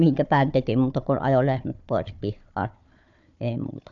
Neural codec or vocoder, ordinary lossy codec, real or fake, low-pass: codec, 16 kHz, 4 kbps, FunCodec, trained on Chinese and English, 50 frames a second; none; fake; 7.2 kHz